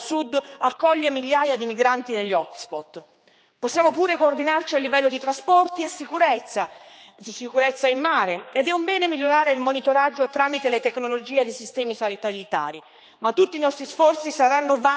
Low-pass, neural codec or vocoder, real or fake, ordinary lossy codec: none; codec, 16 kHz, 2 kbps, X-Codec, HuBERT features, trained on general audio; fake; none